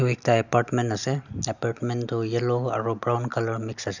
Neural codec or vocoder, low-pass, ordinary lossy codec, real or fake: none; 7.2 kHz; none; real